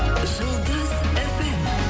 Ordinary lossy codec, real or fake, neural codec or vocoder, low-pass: none; real; none; none